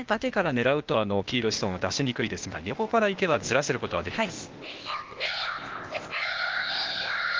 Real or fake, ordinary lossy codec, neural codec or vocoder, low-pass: fake; Opus, 32 kbps; codec, 16 kHz, 0.8 kbps, ZipCodec; 7.2 kHz